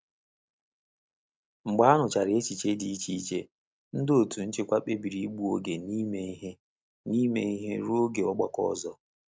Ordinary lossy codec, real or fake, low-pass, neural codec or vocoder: none; real; none; none